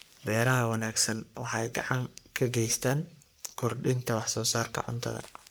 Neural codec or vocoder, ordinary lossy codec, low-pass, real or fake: codec, 44.1 kHz, 3.4 kbps, Pupu-Codec; none; none; fake